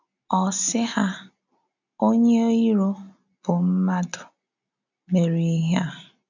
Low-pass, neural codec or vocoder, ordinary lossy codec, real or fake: 7.2 kHz; none; none; real